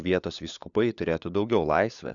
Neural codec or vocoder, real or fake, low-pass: codec, 16 kHz, 4 kbps, FunCodec, trained on LibriTTS, 50 frames a second; fake; 7.2 kHz